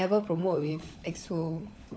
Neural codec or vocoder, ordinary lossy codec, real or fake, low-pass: codec, 16 kHz, 16 kbps, FunCodec, trained on LibriTTS, 50 frames a second; none; fake; none